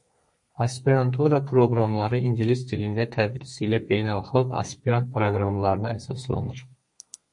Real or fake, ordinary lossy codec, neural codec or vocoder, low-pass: fake; MP3, 48 kbps; codec, 32 kHz, 1.9 kbps, SNAC; 10.8 kHz